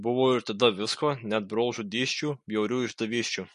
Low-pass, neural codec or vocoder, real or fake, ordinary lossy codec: 14.4 kHz; none; real; MP3, 48 kbps